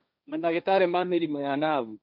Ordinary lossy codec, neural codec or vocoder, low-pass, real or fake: MP3, 48 kbps; codec, 16 kHz, 1.1 kbps, Voila-Tokenizer; 5.4 kHz; fake